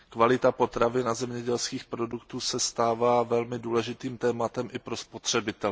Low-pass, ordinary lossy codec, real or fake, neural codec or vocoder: none; none; real; none